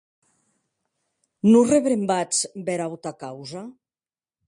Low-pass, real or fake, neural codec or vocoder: 9.9 kHz; real; none